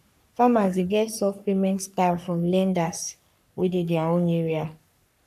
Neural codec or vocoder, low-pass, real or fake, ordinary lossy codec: codec, 44.1 kHz, 3.4 kbps, Pupu-Codec; 14.4 kHz; fake; none